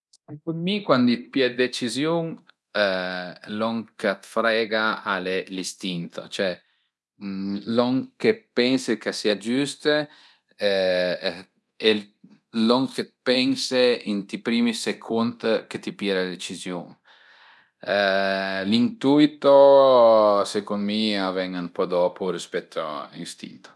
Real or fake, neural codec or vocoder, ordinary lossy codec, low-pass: fake; codec, 24 kHz, 0.9 kbps, DualCodec; none; none